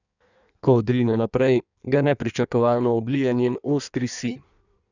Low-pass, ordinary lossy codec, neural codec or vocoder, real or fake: 7.2 kHz; none; codec, 16 kHz in and 24 kHz out, 1.1 kbps, FireRedTTS-2 codec; fake